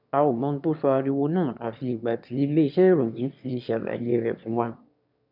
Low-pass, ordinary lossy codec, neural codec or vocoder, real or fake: 5.4 kHz; none; autoencoder, 22.05 kHz, a latent of 192 numbers a frame, VITS, trained on one speaker; fake